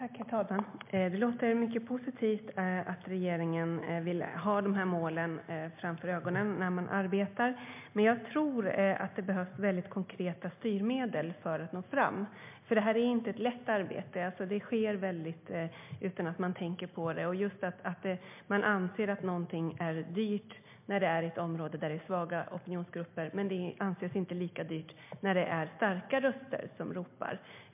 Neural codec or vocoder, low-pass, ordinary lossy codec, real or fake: none; 3.6 kHz; MP3, 32 kbps; real